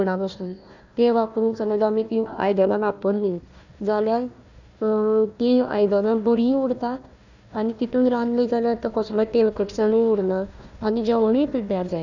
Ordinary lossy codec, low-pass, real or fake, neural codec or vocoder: none; 7.2 kHz; fake; codec, 16 kHz, 1 kbps, FunCodec, trained on Chinese and English, 50 frames a second